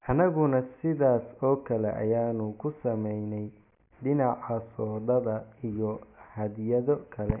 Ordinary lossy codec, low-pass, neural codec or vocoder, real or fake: none; 3.6 kHz; none; real